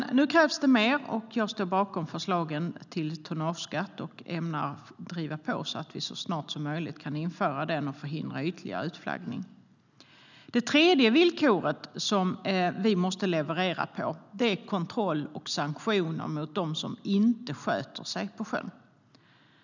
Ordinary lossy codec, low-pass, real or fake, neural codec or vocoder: none; 7.2 kHz; real; none